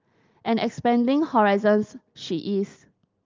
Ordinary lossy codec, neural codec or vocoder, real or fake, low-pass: Opus, 24 kbps; none; real; 7.2 kHz